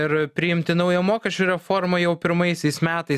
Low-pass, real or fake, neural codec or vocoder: 14.4 kHz; real; none